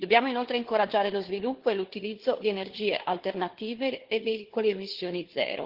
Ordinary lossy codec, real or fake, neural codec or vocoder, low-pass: Opus, 16 kbps; fake; codec, 16 kHz, 4 kbps, FunCodec, trained on Chinese and English, 50 frames a second; 5.4 kHz